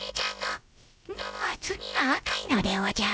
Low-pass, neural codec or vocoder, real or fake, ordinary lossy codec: none; codec, 16 kHz, about 1 kbps, DyCAST, with the encoder's durations; fake; none